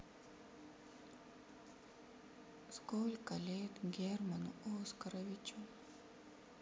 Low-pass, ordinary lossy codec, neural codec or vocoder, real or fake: none; none; none; real